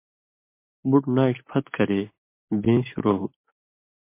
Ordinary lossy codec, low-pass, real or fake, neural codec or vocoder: MP3, 24 kbps; 3.6 kHz; real; none